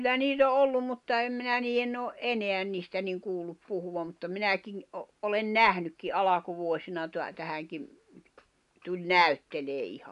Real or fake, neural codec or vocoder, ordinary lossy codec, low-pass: real; none; none; 10.8 kHz